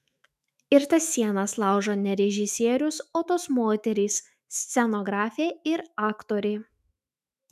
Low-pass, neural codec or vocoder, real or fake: 14.4 kHz; autoencoder, 48 kHz, 128 numbers a frame, DAC-VAE, trained on Japanese speech; fake